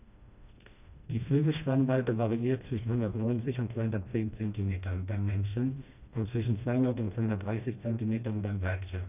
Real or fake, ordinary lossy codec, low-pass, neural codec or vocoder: fake; none; 3.6 kHz; codec, 16 kHz, 1 kbps, FreqCodec, smaller model